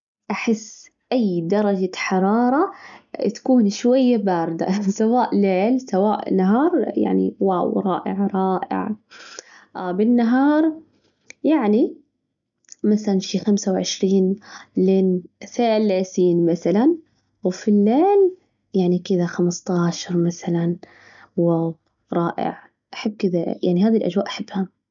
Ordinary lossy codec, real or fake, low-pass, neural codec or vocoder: none; real; 7.2 kHz; none